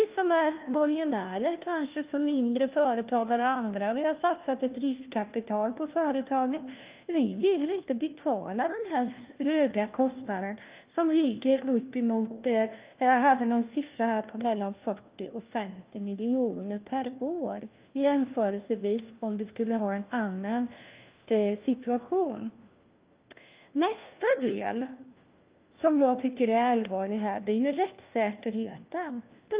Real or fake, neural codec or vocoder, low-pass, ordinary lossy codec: fake; codec, 16 kHz, 1 kbps, FunCodec, trained on LibriTTS, 50 frames a second; 3.6 kHz; Opus, 32 kbps